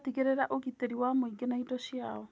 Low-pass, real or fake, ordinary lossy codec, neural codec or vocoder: none; real; none; none